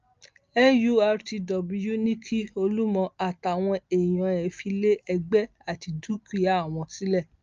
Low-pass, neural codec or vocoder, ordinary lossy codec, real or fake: 7.2 kHz; none; Opus, 24 kbps; real